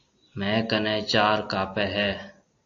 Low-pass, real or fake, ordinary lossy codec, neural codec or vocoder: 7.2 kHz; real; AAC, 48 kbps; none